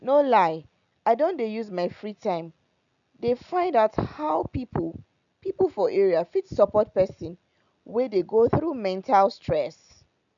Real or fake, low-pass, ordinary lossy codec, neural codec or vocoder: real; 7.2 kHz; none; none